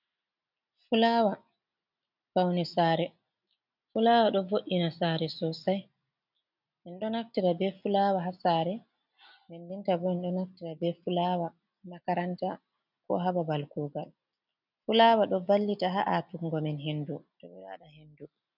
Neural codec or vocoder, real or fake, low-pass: none; real; 5.4 kHz